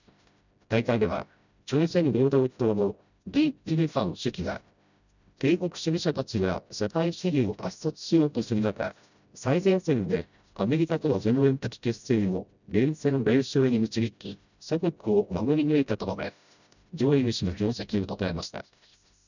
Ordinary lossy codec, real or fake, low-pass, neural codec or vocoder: none; fake; 7.2 kHz; codec, 16 kHz, 0.5 kbps, FreqCodec, smaller model